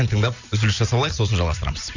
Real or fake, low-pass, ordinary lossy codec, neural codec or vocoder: real; 7.2 kHz; none; none